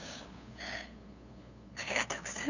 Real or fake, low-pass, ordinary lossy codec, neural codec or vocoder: fake; 7.2 kHz; none; codec, 16 kHz, 2 kbps, FunCodec, trained on LibriTTS, 25 frames a second